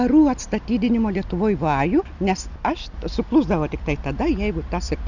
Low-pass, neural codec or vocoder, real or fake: 7.2 kHz; none; real